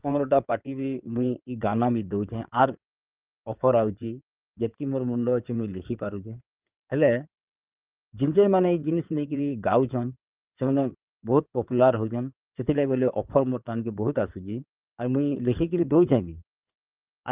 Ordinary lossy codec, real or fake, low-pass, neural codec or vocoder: Opus, 24 kbps; fake; 3.6 kHz; codec, 16 kHz in and 24 kHz out, 2.2 kbps, FireRedTTS-2 codec